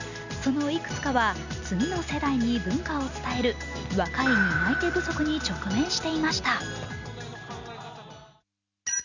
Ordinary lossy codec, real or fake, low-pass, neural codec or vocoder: none; real; 7.2 kHz; none